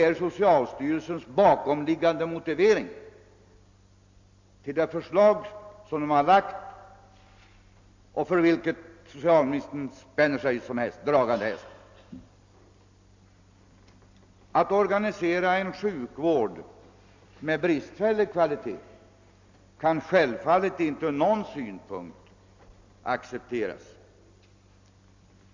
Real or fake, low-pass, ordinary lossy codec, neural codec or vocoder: real; 7.2 kHz; MP3, 64 kbps; none